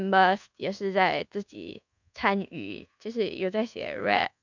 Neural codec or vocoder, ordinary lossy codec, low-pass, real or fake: codec, 24 kHz, 1.2 kbps, DualCodec; none; 7.2 kHz; fake